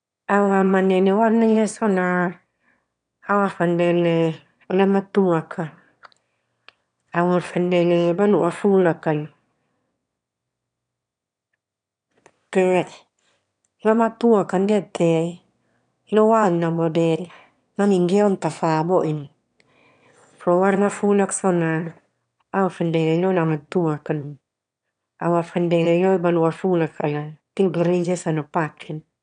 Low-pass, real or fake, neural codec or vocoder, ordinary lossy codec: 9.9 kHz; fake; autoencoder, 22.05 kHz, a latent of 192 numbers a frame, VITS, trained on one speaker; none